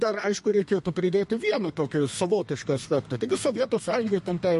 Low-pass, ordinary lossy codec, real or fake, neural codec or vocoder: 14.4 kHz; MP3, 48 kbps; fake; codec, 32 kHz, 1.9 kbps, SNAC